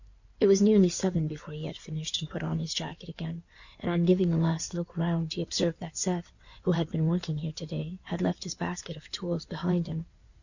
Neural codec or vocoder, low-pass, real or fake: codec, 16 kHz in and 24 kHz out, 2.2 kbps, FireRedTTS-2 codec; 7.2 kHz; fake